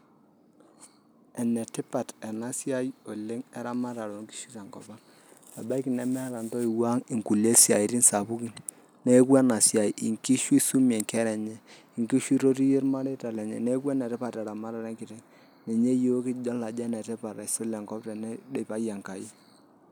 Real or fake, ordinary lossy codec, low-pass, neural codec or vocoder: real; none; none; none